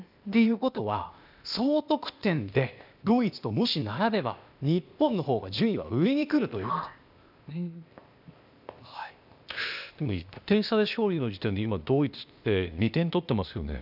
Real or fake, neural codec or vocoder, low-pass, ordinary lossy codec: fake; codec, 16 kHz, 0.8 kbps, ZipCodec; 5.4 kHz; none